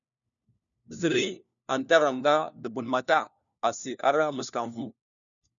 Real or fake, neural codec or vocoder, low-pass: fake; codec, 16 kHz, 1 kbps, FunCodec, trained on LibriTTS, 50 frames a second; 7.2 kHz